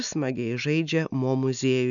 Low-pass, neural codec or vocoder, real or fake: 7.2 kHz; none; real